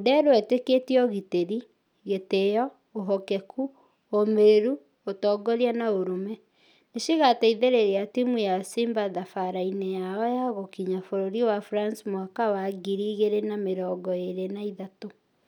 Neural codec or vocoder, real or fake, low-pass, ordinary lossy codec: none; real; 19.8 kHz; none